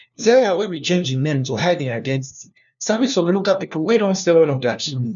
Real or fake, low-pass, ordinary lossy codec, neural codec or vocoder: fake; 7.2 kHz; none; codec, 16 kHz, 1 kbps, FunCodec, trained on LibriTTS, 50 frames a second